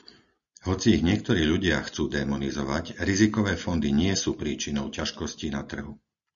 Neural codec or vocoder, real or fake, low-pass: none; real; 7.2 kHz